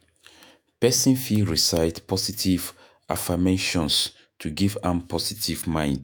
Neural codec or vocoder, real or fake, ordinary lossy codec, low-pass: autoencoder, 48 kHz, 128 numbers a frame, DAC-VAE, trained on Japanese speech; fake; none; none